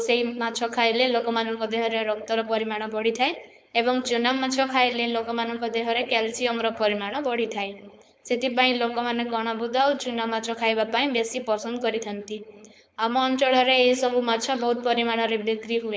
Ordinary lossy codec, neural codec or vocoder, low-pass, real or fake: none; codec, 16 kHz, 4.8 kbps, FACodec; none; fake